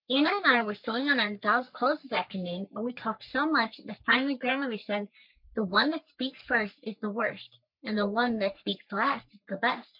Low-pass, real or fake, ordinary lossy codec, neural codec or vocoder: 5.4 kHz; fake; MP3, 48 kbps; codec, 44.1 kHz, 3.4 kbps, Pupu-Codec